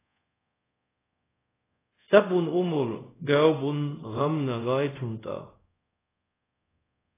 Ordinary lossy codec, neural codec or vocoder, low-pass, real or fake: AAC, 16 kbps; codec, 24 kHz, 0.5 kbps, DualCodec; 3.6 kHz; fake